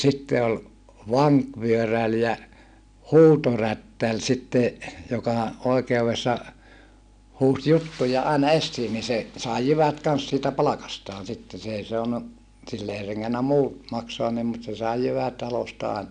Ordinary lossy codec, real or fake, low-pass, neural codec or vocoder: none; real; 9.9 kHz; none